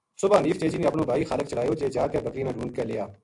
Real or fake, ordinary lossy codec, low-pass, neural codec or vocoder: real; AAC, 64 kbps; 10.8 kHz; none